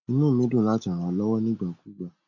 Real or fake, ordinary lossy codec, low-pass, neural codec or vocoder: real; none; 7.2 kHz; none